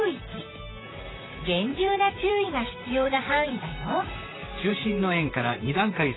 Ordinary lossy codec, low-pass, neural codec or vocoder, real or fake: AAC, 16 kbps; 7.2 kHz; vocoder, 44.1 kHz, 128 mel bands, Pupu-Vocoder; fake